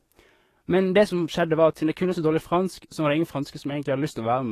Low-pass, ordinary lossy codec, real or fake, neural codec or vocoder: 14.4 kHz; AAC, 48 kbps; fake; codec, 44.1 kHz, 7.8 kbps, DAC